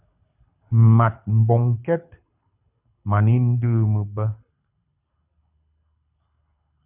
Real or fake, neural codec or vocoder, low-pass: fake; codec, 24 kHz, 6 kbps, HILCodec; 3.6 kHz